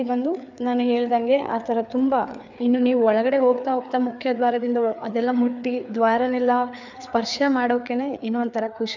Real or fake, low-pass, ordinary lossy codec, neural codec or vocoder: fake; 7.2 kHz; none; codec, 16 kHz, 4 kbps, FreqCodec, larger model